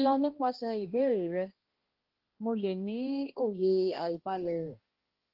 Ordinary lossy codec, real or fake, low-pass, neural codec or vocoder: Opus, 16 kbps; fake; 5.4 kHz; codec, 16 kHz, 1 kbps, X-Codec, HuBERT features, trained on balanced general audio